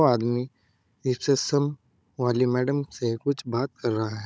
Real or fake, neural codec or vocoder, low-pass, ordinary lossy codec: fake; codec, 16 kHz, 16 kbps, FunCodec, trained on Chinese and English, 50 frames a second; none; none